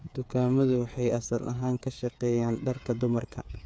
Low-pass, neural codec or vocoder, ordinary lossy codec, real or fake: none; codec, 16 kHz, 8 kbps, FreqCodec, smaller model; none; fake